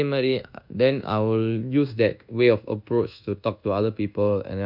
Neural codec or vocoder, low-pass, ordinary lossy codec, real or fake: codec, 24 kHz, 1.2 kbps, DualCodec; 5.4 kHz; none; fake